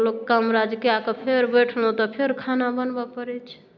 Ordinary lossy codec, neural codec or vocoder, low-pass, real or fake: none; none; 7.2 kHz; real